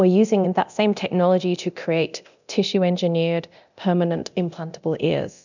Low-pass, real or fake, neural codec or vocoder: 7.2 kHz; fake; codec, 24 kHz, 0.9 kbps, DualCodec